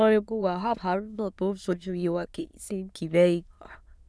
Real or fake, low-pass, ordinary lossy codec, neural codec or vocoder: fake; none; none; autoencoder, 22.05 kHz, a latent of 192 numbers a frame, VITS, trained on many speakers